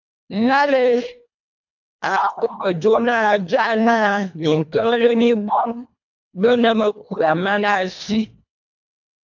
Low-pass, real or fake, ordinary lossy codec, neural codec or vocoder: 7.2 kHz; fake; MP3, 48 kbps; codec, 24 kHz, 1.5 kbps, HILCodec